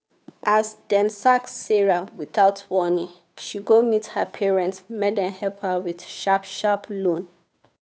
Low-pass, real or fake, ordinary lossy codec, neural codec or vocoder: none; fake; none; codec, 16 kHz, 2 kbps, FunCodec, trained on Chinese and English, 25 frames a second